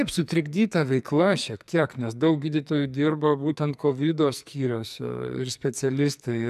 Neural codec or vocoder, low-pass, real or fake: codec, 44.1 kHz, 2.6 kbps, SNAC; 14.4 kHz; fake